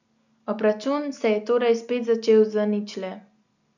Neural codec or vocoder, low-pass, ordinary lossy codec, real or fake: none; 7.2 kHz; none; real